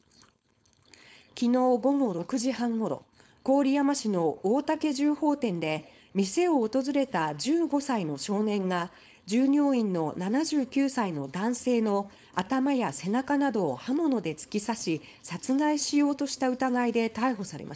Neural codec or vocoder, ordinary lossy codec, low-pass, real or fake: codec, 16 kHz, 4.8 kbps, FACodec; none; none; fake